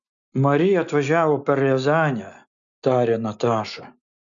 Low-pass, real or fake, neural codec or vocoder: 7.2 kHz; real; none